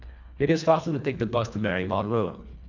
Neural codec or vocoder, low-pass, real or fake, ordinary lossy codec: codec, 24 kHz, 1.5 kbps, HILCodec; 7.2 kHz; fake; none